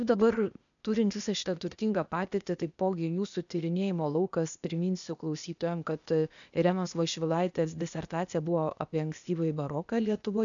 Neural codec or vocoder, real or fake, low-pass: codec, 16 kHz, 0.8 kbps, ZipCodec; fake; 7.2 kHz